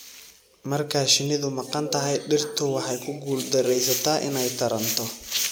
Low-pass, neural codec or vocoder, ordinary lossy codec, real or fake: none; none; none; real